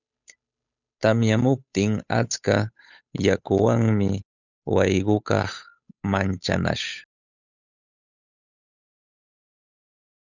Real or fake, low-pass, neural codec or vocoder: fake; 7.2 kHz; codec, 16 kHz, 8 kbps, FunCodec, trained on Chinese and English, 25 frames a second